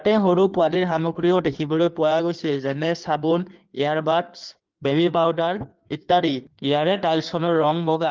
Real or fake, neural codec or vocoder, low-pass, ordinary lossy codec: fake; codec, 16 kHz in and 24 kHz out, 1.1 kbps, FireRedTTS-2 codec; 7.2 kHz; Opus, 24 kbps